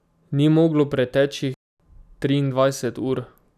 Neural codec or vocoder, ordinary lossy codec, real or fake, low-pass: none; none; real; 14.4 kHz